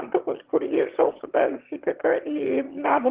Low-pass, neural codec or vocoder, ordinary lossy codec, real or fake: 3.6 kHz; autoencoder, 22.05 kHz, a latent of 192 numbers a frame, VITS, trained on one speaker; Opus, 16 kbps; fake